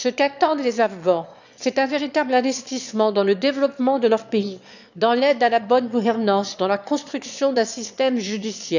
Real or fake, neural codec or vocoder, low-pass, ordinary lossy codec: fake; autoencoder, 22.05 kHz, a latent of 192 numbers a frame, VITS, trained on one speaker; 7.2 kHz; none